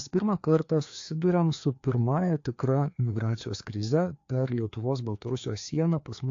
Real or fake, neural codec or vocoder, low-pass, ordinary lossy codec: fake; codec, 16 kHz, 2 kbps, FreqCodec, larger model; 7.2 kHz; AAC, 48 kbps